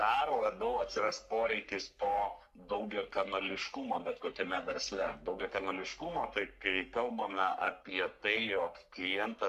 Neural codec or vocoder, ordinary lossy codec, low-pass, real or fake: codec, 44.1 kHz, 3.4 kbps, Pupu-Codec; MP3, 96 kbps; 14.4 kHz; fake